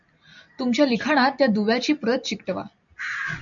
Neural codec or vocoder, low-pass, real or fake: none; 7.2 kHz; real